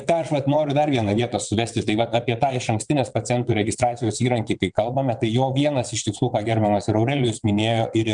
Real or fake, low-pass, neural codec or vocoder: fake; 9.9 kHz; vocoder, 22.05 kHz, 80 mel bands, WaveNeXt